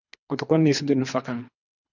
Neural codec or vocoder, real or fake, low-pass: codec, 24 kHz, 3 kbps, HILCodec; fake; 7.2 kHz